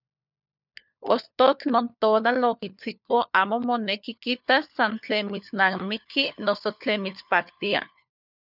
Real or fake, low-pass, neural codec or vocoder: fake; 5.4 kHz; codec, 16 kHz, 4 kbps, FunCodec, trained on LibriTTS, 50 frames a second